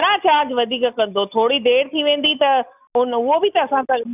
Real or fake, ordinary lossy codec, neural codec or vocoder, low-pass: real; none; none; 3.6 kHz